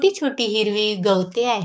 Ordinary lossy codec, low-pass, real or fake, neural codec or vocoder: none; none; fake; codec, 16 kHz, 6 kbps, DAC